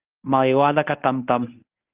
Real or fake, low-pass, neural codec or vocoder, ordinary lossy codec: fake; 3.6 kHz; codec, 24 kHz, 0.9 kbps, WavTokenizer, medium speech release version 1; Opus, 32 kbps